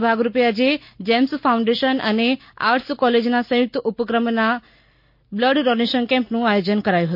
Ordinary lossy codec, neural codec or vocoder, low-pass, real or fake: MP3, 32 kbps; none; 5.4 kHz; real